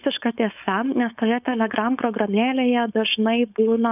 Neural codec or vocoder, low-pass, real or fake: codec, 16 kHz, 4.8 kbps, FACodec; 3.6 kHz; fake